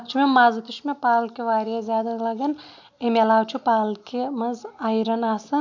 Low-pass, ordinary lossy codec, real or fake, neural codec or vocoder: 7.2 kHz; none; real; none